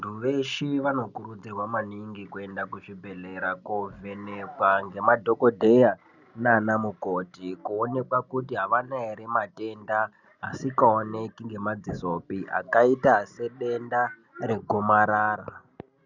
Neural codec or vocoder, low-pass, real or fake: none; 7.2 kHz; real